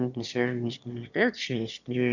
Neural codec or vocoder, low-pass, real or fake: autoencoder, 22.05 kHz, a latent of 192 numbers a frame, VITS, trained on one speaker; 7.2 kHz; fake